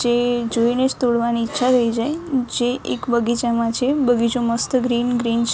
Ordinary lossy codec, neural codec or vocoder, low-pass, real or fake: none; none; none; real